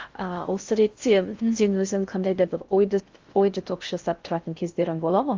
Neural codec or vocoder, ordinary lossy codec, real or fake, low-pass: codec, 16 kHz in and 24 kHz out, 0.6 kbps, FocalCodec, streaming, 2048 codes; Opus, 32 kbps; fake; 7.2 kHz